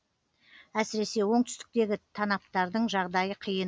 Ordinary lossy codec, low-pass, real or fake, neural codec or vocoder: none; none; real; none